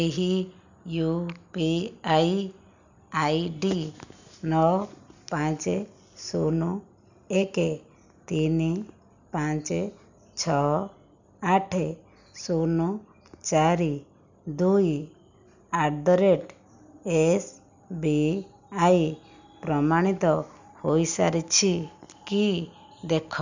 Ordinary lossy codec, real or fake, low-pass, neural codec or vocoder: none; real; 7.2 kHz; none